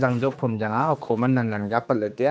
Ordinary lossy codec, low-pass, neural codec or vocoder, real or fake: none; none; codec, 16 kHz, 2 kbps, X-Codec, HuBERT features, trained on general audio; fake